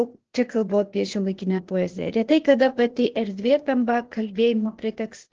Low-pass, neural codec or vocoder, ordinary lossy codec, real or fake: 7.2 kHz; codec, 16 kHz, 0.8 kbps, ZipCodec; Opus, 16 kbps; fake